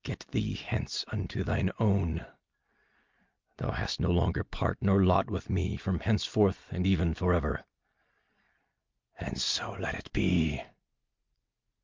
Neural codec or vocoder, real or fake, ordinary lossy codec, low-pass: none; real; Opus, 32 kbps; 7.2 kHz